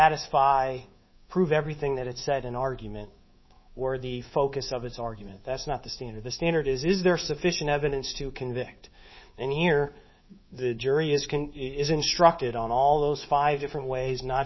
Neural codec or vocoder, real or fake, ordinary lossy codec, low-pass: codec, 24 kHz, 3.1 kbps, DualCodec; fake; MP3, 24 kbps; 7.2 kHz